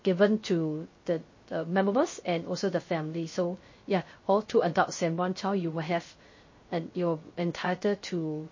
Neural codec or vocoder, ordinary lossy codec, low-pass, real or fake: codec, 16 kHz, 0.3 kbps, FocalCodec; MP3, 32 kbps; 7.2 kHz; fake